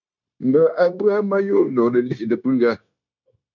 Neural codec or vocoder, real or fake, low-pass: codec, 16 kHz, 0.9 kbps, LongCat-Audio-Codec; fake; 7.2 kHz